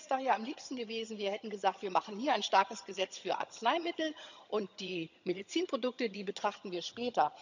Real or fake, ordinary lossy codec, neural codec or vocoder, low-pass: fake; none; vocoder, 22.05 kHz, 80 mel bands, HiFi-GAN; 7.2 kHz